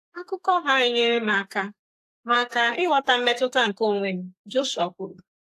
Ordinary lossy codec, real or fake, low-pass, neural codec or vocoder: AAC, 64 kbps; fake; 14.4 kHz; codec, 32 kHz, 1.9 kbps, SNAC